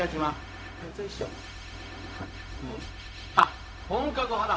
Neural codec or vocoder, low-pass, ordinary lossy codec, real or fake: codec, 16 kHz, 0.4 kbps, LongCat-Audio-Codec; none; none; fake